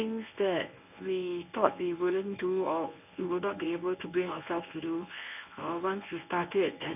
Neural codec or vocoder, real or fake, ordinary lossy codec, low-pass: codec, 24 kHz, 0.9 kbps, WavTokenizer, medium speech release version 1; fake; none; 3.6 kHz